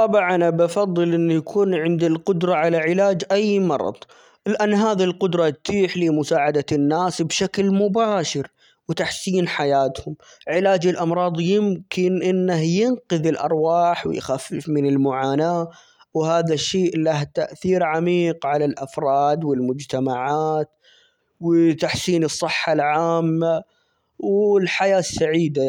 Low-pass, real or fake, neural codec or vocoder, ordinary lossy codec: 19.8 kHz; real; none; none